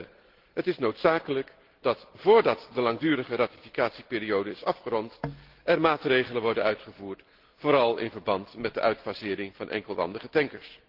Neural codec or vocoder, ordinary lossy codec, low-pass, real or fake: none; Opus, 16 kbps; 5.4 kHz; real